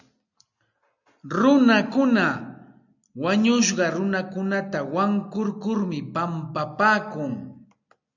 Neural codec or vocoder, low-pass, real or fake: none; 7.2 kHz; real